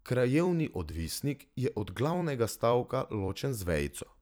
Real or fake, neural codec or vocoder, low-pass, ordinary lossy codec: fake; vocoder, 44.1 kHz, 128 mel bands every 256 samples, BigVGAN v2; none; none